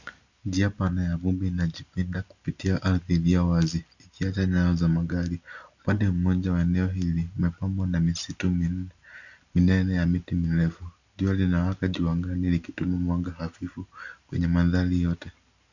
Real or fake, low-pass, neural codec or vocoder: real; 7.2 kHz; none